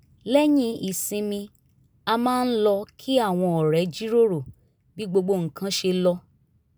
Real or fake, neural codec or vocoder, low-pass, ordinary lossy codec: real; none; none; none